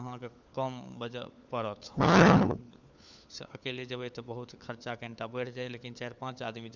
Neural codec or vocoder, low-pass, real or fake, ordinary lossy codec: codec, 16 kHz, 4 kbps, FunCodec, trained on LibriTTS, 50 frames a second; none; fake; none